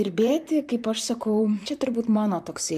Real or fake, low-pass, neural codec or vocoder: fake; 14.4 kHz; vocoder, 44.1 kHz, 128 mel bands, Pupu-Vocoder